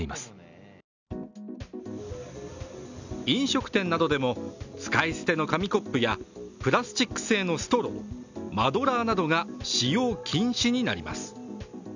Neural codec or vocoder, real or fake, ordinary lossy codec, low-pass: none; real; none; 7.2 kHz